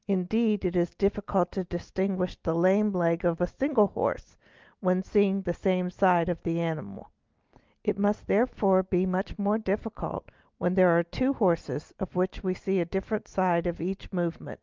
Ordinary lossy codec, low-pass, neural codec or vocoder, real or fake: Opus, 32 kbps; 7.2 kHz; none; real